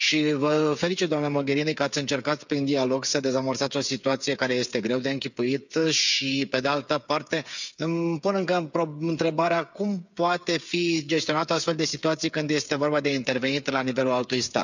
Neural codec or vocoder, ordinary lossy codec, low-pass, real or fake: codec, 16 kHz, 8 kbps, FreqCodec, smaller model; none; 7.2 kHz; fake